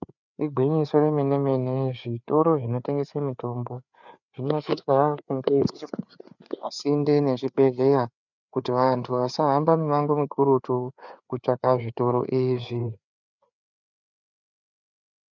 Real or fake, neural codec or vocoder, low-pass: fake; codec, 16 kHz, 4 kbps, FreqCodec, larger model; 7.2 kHz